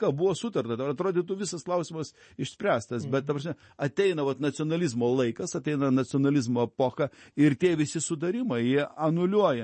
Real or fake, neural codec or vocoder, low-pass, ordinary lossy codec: real; none; 10.8 kHz; MP3, 32 kbps